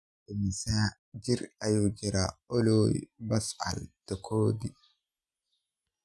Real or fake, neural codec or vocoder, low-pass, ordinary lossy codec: real; none; none; none